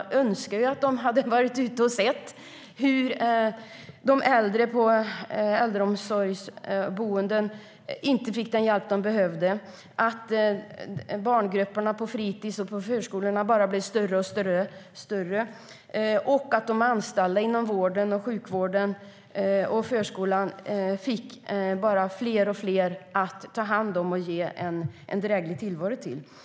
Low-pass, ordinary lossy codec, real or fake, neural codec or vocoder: none; none; real; none